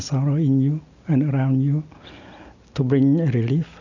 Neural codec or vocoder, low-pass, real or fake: none; 7.2 kHz; real